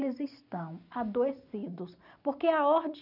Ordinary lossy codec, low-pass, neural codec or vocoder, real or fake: none; 5.4 kHz; none; real